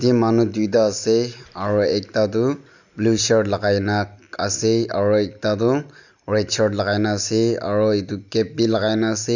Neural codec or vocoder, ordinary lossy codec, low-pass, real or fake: none; none; 7.2 kHz; real